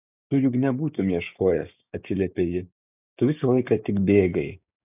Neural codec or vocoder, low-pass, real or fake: codec, 16 kHz, 6 kbps, DAC; 3.6 kHz; fake